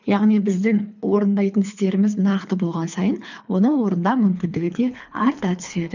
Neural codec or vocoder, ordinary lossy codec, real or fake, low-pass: codec, 24 kHz, 3 kbps, HILCodec; none; fake; 7.2 kHz